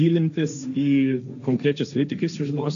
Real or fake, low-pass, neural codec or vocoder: fake; 7.2 kHz; codec, 16 kHz, 1.1 kbps, Voila-Tokenizer